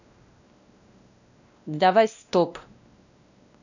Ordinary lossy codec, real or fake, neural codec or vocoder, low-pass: none; fake; codec, 16 kHz, 1 kbps, X-Codec, WavLM features, trained on Multilingual LibriSpeech; 7.2 kHz